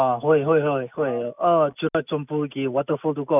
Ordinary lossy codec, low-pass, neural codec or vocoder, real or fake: none; 3.6 kHz; none; real